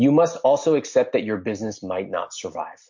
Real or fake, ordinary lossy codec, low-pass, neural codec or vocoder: real; MP3, 48 kbps; 7.2 kHz; none